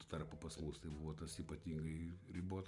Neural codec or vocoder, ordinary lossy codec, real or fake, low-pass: none; Opus, 64 kbps; real; 10.8 kHz